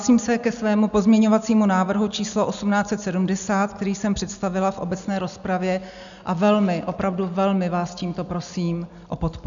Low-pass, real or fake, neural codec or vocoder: 7.2 kHz; real; none